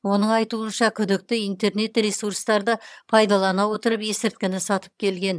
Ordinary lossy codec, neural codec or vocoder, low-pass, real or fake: none; vocoder, 22.05 kHz, 80 mel bands, HiFi-GAN; none; fake